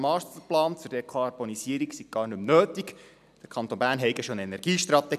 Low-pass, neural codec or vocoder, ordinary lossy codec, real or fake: 14.4 kHz; none; none; real